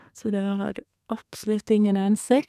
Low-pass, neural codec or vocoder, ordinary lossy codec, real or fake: 14.4 kHz; codec, 32 kHz, 1.9 kbps, SNAC; none; fake